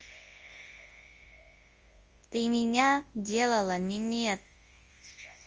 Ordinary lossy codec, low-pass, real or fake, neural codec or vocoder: Opus, 24 kbps; 7.2 kHz; fake; codec, 24 kHz, 0.9 kbps, WavTokenizer, large speech release